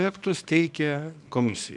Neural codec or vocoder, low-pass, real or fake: codec, 24 kHz, 0.9 kbps, WavTokenizer, small release; 10.8 kHz; fake